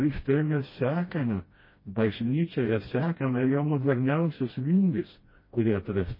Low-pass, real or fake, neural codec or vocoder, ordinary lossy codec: 5.4 kHz; fake; codec, 16 kHz, 1 kbps, FreqCodec, smaller model; MP3, 24 kbps